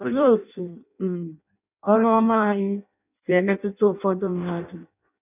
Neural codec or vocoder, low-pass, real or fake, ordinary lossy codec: codec, 16 kHz in and 24 kHz out, 0.6 kbps, FireRedTTS-2 codec; 3.6 kHz; fake; none